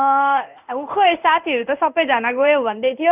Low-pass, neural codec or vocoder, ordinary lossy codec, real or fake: 3.6 kHz; codec, 16 kHz in and 24 kHz out, 1 kbps, XY-Tokenizer; none; fake